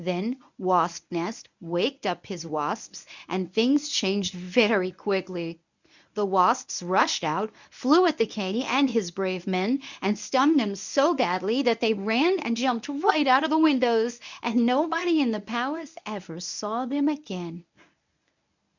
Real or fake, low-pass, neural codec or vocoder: fake; 7.2 kHz; codec, 24 kHz, 0.9 kbps, WavTokenizer, medium speech release version 1